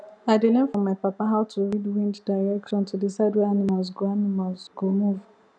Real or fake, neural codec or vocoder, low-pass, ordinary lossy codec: real; none; 9.9 kHz; none